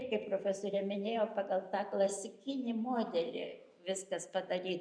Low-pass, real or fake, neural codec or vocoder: 9.9 kHz; fake; vocoder, 44.1 kHz, 128 mel bands every 512 samples, BigVGAN v2